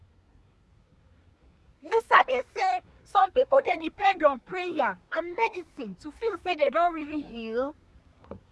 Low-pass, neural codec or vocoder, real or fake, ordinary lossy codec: none; codec, 24 kHz, 1 kbps, SNAC; fake; none